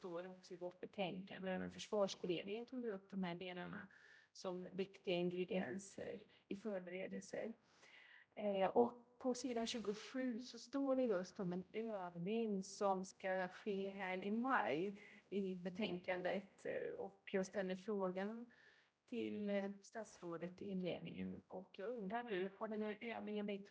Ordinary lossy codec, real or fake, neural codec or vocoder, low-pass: none; fake; codec, 16 kHz, 0.5 kbps, X-Codec, HuBERT features, trained on general audio; none